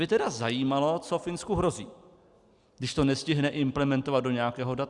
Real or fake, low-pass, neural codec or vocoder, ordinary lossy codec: real; 10.8 kHz; none; Opus, 64 kbps